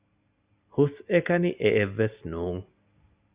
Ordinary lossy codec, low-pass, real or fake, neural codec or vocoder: Opus, 64 kbps; 3.6 kHz; real; none